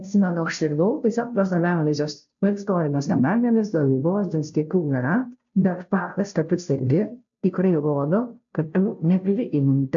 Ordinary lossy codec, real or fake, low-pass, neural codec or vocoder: AAC, 64 kbps; fake; 7.2 kHz; codec, 16 kHz, 0.5 kbps, FunCodec, trained on Chinese and English, 25 frames a second